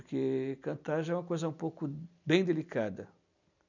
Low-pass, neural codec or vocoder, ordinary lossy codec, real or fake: 7.2 kHz; none; none; real